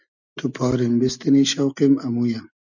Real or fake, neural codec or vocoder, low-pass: real; none; 7.2 kHz